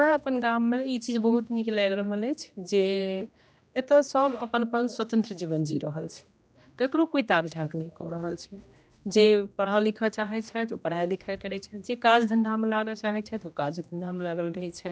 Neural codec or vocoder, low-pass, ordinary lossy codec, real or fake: codec, 16 kHz, 1 kbps, X-Codec, HuBERT features, trained on general audio; none; none; fake